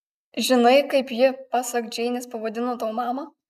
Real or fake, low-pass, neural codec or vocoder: real; 14.4 kHz; none